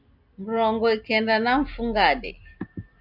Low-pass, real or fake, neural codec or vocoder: 5.4 kHz; real; none